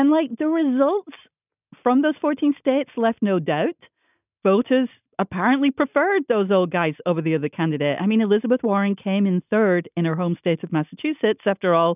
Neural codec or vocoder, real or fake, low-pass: none; real; 3.6 kHz